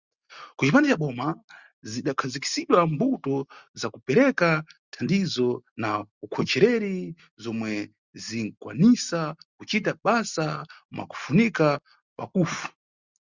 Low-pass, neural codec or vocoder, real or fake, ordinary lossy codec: 7.2 kHz; none; real; Opus, 64 kbps